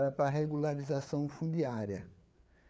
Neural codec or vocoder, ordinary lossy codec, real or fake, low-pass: codec, 16 kHz, 4 kbps, FreqCodec, larger model; none; fake; none